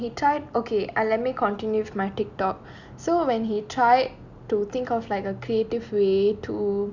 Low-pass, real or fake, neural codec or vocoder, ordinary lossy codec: 7.2 kHz; real; none; none